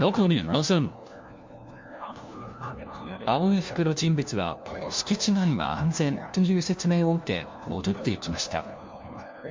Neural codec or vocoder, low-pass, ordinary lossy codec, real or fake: codec, 16 kHz, 1 kbps, FunCodec, trained on LibriTTS, 50 frames a second; 7.2 kHz; MP3, 48 kbps; fake